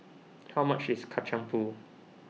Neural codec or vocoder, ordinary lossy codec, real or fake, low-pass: none; none; real; none